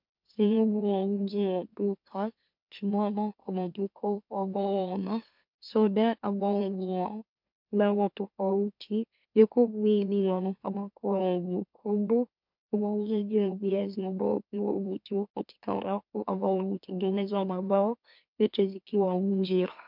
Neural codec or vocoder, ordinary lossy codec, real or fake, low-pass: autoencoder, 44.1 kHz, a latent of 192 numbers a frame, MeloTTS; MP3, 48 kbps; fake; 5.4 kHz